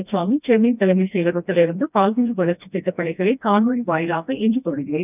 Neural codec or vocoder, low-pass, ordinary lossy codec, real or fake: codec, 16 kHz, 1 kbps, FreqCodec, smaller model; 3.6 kHz; none; fake